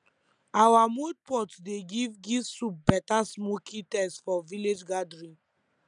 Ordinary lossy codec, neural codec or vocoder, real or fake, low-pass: none; none; real; 9.9 kHz